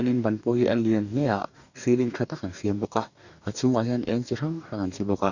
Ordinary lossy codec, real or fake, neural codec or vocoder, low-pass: none; fake; codec, 44.1 kHz, 2.6 kbps, DAC; 7.2 kHz